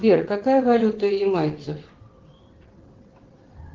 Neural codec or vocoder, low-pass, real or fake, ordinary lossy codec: vocoder, 22.05 kHz, 80 mel bands, WaveNeXt; 7.2 kHz; fake; Opus, 16 kbps